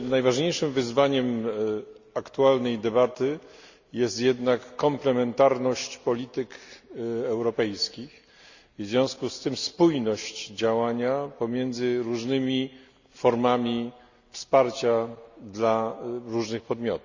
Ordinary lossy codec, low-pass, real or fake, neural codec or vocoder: Opus, 64 kbps; 7.2 kHz; real; none